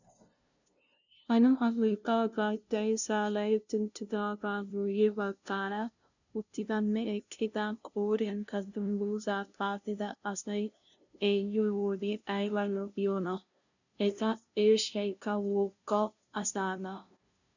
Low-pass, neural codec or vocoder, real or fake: 7.2 kHz; codec, 16 kHz, 0.5 kbps, FunCodec, trained on LibriTTS, 25 frames a second; fake